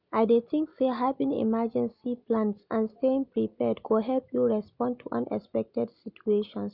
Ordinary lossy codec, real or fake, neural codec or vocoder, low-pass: none; real; none; 5.4 kHz